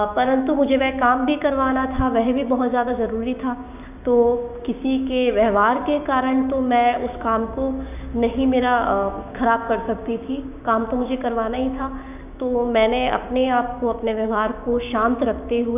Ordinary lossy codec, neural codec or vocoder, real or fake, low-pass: none; codec, 16 kHz, 6 kbps, DAC; fake; 3.6 kHz